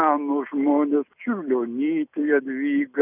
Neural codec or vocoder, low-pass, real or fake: none; 3.6 kHz; real